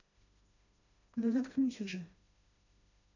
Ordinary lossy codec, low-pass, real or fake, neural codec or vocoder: none; 7.2 kHz; fake; codec, 16 kHz, 1 kbps, FreqCodec, smaller model